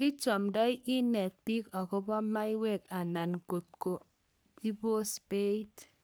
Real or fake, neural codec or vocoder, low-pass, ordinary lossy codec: fake; codec, 44.1 kHz, 3.4 kbps, Pupu-Codec; none; none